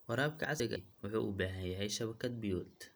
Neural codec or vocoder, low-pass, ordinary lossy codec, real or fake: none; none; none; real